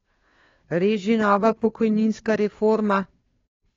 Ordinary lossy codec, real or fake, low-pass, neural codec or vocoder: AAC, 32 kbps; fake; 7.2 kHz; codec, 16 kHz, 2 kbps, FunCodec, trained on Chinese and English, 25 frames a second